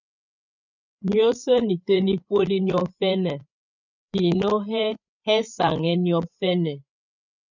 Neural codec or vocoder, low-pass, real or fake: codec, 16 kHz, 8 kbps, FreqCodec, larger model; 7.2 kHz; fake